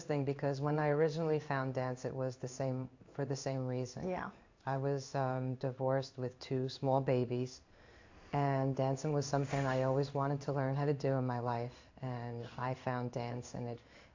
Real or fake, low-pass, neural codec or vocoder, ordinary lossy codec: fake; 7.2 kHz; codec, 16 kHz in and 24 kHz out, 1 kbps, XY-Tokenizer; AAC, 48 kbps